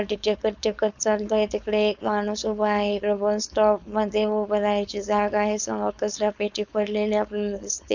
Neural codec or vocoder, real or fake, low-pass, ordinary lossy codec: codec, 16 kHz, 4.8 kbps, FACodec; fake; 7.2 kHz; none